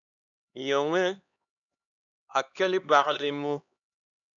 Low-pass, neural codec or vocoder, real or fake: 7.2 kHz; codec, 16 kHz, 2 kbps, X-Codec, HuBERT features, trained on LibriSpeech; fake